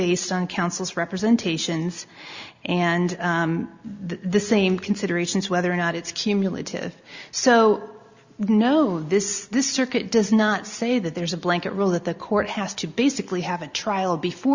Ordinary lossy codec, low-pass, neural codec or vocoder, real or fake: Opus, 64 kbps; 7.2 kHz; none; real